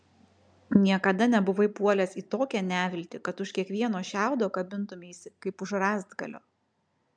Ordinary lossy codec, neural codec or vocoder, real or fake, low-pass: MP3, 96 kbps; none; real; 9.9 kHz